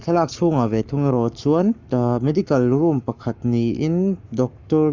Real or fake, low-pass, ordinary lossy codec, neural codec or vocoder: fake; 7.2 kHz; Opus, 64 kbps; codec, 44.1 kHz, 7.8 kbps, Pupu-Codec